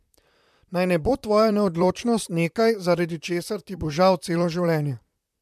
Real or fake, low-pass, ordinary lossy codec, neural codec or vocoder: fake; 14.4 kHz; MP3, 96 kbps; vocoder, 44.1 kHz, 128 mel bands, Pupu-Vocoder